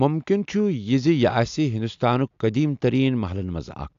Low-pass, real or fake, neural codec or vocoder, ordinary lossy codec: 7.2 kHz; real; none; AAC, 64 kbps